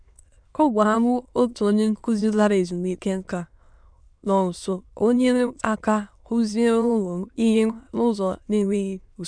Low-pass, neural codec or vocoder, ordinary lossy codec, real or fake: 9.9 kHz; autoencoder, 22.05 kHz, a latent of 192 numbers a frame, VITS, trained on many speakers; none; fake